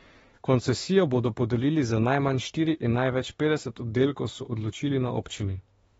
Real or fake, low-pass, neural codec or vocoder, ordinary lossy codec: fake; 19.8 kHz; autoencoder, 48 kHz, 32 numbers a frame, DAC-VAE, trained on Japanese speech; AAC, 24 kbps